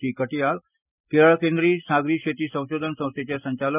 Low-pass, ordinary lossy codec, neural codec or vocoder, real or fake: 3.6 kHz; none; none; real